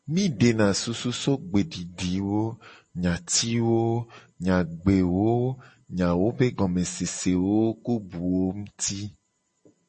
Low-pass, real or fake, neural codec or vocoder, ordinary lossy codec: 10.8 kHz; real; none; MP3, 32 kbps